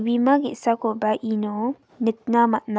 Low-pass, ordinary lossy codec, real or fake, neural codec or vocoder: none; none; real; none